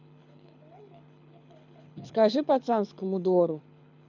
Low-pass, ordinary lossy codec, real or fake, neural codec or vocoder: 7.2 kHz; none; fake; codec, 24 kHz, 6 kbps, HILCodec